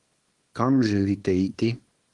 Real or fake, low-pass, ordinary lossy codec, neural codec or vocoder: fake; 10.8 kHz; Opus, 24 kbps; codec, 24 kHz, 0.9 kbps, WavTokenizer, small release